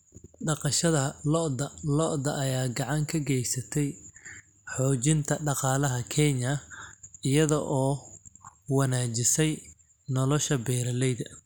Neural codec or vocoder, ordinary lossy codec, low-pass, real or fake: none; none; none; real